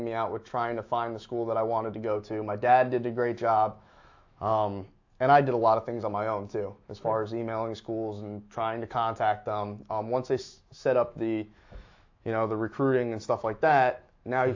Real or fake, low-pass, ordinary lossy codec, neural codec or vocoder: fake; 7.2 kHz; AAC, 48 kbps; autoencoder, 48 kHz, 128 numbers a frame, DAC-VAE, trained on Japanese speech